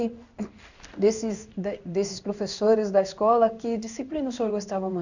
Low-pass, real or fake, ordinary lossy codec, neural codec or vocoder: 7.2 kHz; fake; none; codec, 16 kHz in and 24 kHz out, 1 kbps, XY-Tokenizer